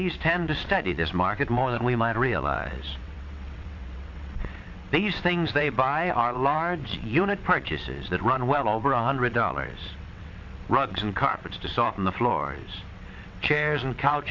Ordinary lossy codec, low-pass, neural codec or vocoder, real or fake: MP3, 48 kbps; 7.2 kHz; vocoder, 22.05 kHz, 80 mel bands, WaveNeXt; fake